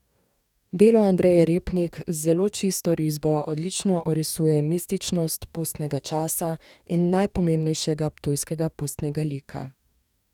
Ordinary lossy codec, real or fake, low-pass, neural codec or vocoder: none; fake; 19.8 kHz; codec, 44.1 kHz, 2.6 kbps, DAC